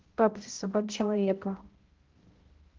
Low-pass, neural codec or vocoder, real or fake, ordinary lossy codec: 7.2 kHz; codec, 16 kHz, 0.5 kbps, X-Codec, HuBERT features, trained on balanced general audio; fake; Opus, 16 kbps